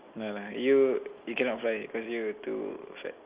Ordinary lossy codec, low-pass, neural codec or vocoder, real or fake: Opus, 24 kbps; 3.6 kHz; none; real